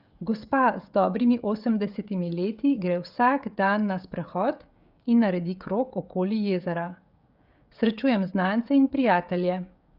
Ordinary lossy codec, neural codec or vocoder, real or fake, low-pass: Opus, 64 kbps; codec, 16 kHz, 16 kbps, FreqCodec, smaller model; fake; 5.4 kHz